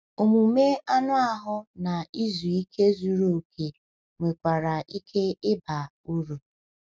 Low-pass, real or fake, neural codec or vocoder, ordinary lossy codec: none; real; none; none